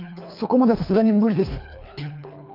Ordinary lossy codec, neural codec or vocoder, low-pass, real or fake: none; codec, 24 kHz, 3 kbps, HILCodec; 5.4 kHz; fake